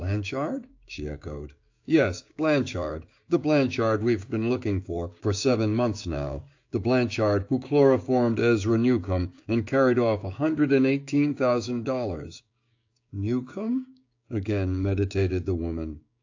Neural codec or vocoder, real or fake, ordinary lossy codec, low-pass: codec, 16 kHz, 6 kbps, DAC; fake; AAC, 48 kbps; 7.2 kHz